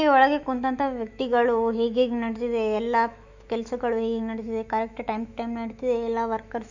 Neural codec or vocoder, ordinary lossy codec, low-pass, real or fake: autoencoder, 48 kHz, 128 numbers a frame, DAC-VAE, trained on Japanese speech; none; 7.2 kHz; fake